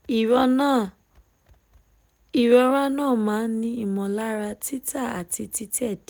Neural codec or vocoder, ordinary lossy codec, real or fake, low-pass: none; none; real; none